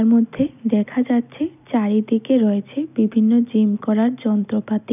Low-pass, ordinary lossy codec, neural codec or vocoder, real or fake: 3.6 kHz; none; none; real